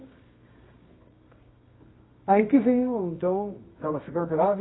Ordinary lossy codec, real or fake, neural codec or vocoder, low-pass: AAC, 16 kbps; fake; codec, 24 kHz, 0.9 kbps, WavTokenizer, medium music audio release; 7.2 kHz